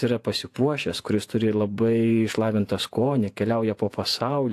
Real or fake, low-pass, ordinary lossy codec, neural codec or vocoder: fake; 14.4 kHz; AAC, 64 kbps; vocoder, 48 kHz, 128 mel bands, Vocos